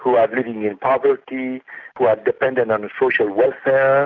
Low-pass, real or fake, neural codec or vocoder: 7.2 kHz; real; none